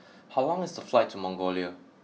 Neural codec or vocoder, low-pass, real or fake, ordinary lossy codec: none; none; real; none